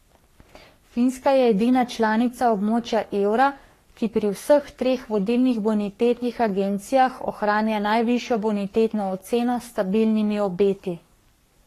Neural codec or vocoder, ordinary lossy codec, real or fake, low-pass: codec, 44.1 kHz, 3.4 kbps, Pupu-Codec; AAC, 48 kbps; fake; 14.4 kHz